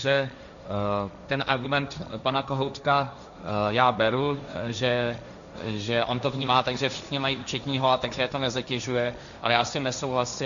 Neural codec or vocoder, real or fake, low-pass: codec, 16 kHz, 1.1 kbps, Voila-Tokenizer; fake; 7.2 kHz